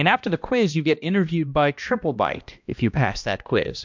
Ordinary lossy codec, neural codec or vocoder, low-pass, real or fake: MP3, 64 kbps; codec, 16 kHz, 1 kbps, X-Codec, HuBERT features, trained on balanced general audio; 7.2 kHz; fake